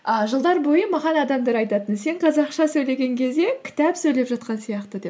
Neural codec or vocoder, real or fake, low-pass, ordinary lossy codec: none; real; none; none